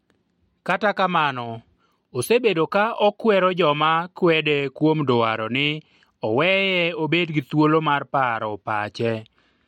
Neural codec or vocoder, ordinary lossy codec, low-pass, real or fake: none; MP3, 64 kbps; 19.8 kHz; real